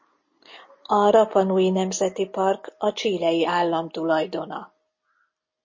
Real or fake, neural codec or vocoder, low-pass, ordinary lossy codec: real; none; 7.2 kHz; MP3, 32 kbps